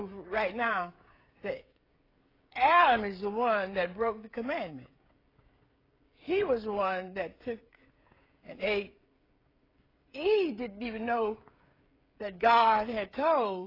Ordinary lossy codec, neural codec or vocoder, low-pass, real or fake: AAC, 24 kbps; codec, 16 kHz, 16 kbps, FreqCodec, smaller model; 5.4 kHz; fake